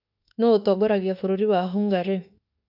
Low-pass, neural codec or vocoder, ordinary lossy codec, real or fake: 5.4 kHz; autoencoder, 48 kHz, 32 numbers a frame, DAC-VAE, trained on Japanese speech; none; fake